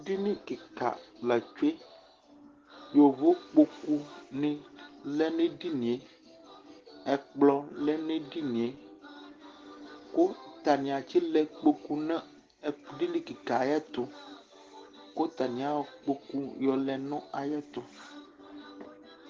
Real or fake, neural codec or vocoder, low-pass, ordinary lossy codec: real; none; 7.2 kHz; Opus, 16 kbps